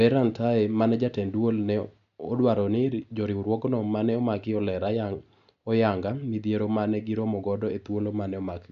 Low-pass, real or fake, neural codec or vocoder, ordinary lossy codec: 7.2 kHz; real; none; none